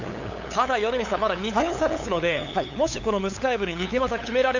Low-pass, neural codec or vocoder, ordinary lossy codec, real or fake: 7.2 kHz; codec, 16 kHz, 8 kbps, FunCodec, trained on LibriTTS, 25 frames a second; none; fake